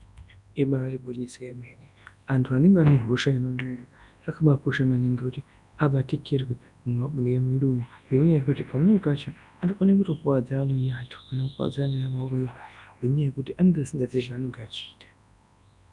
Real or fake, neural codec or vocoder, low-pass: fake; codec, 24 kHz, 0.9 kbps, WavTokenizer, large speech release; 10.8 kHz